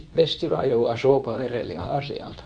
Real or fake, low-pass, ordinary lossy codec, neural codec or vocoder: fake; 9.9 kHz; none; codec, 24 kHz, 0.9 kbps, WavTokenizer, medium speech release version 2